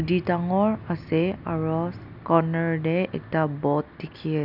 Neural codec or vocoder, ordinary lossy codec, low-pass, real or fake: none; none; 5.4 kHz; real